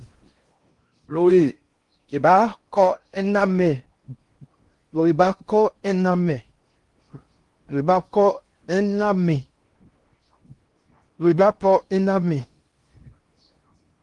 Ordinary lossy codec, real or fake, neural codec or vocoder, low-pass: Opus, 24 kbps; fake; codec, 16 kHz in and 24 kHz out, 0.6 kbps, FocalCodec, streaming, 4096 codes; 10.8 kHz